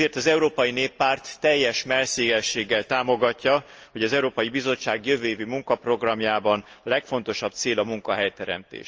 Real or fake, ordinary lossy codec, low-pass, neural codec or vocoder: real; Opus, 24 kbps; 7.2 kHz; none